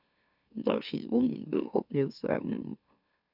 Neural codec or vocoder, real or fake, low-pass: autoencoder, 44.1 kHz, a latent of 192 numbers a frame, MeloTTS; fake; 5.4 kHz